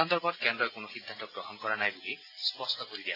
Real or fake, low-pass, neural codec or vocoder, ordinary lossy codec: real; 5.4 kHz; none; AAC, 24 kbps